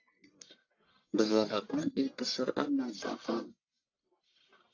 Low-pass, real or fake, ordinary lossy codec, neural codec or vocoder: 7.2 kHz; fake; AAC, 48 kbps; codec, 44.1 kHz, 1.7 kbps, Pupu-Codec